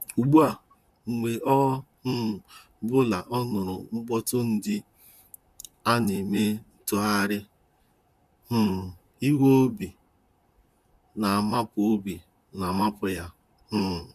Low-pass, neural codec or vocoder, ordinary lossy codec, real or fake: 14.4 kHz; vocoder, 44.1 kHz, 128 mel bands, Pupu-Vocoder; none; fake